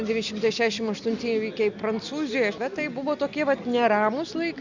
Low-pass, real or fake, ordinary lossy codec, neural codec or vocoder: 7.2 kHz; real; Opus, 64 kbps; none